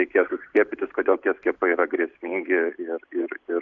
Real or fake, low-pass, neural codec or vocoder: real; 7.2 kHz; none